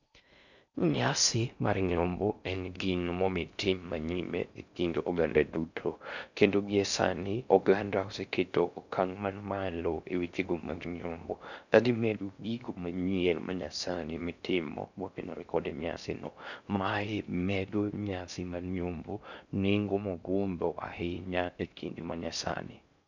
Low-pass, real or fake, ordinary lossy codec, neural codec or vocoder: 7.2 kHz; fake; none; codec, 16 kHz in and 24 kHz out, 0.6 kbps, FocalCodec, streaming, 4096 codes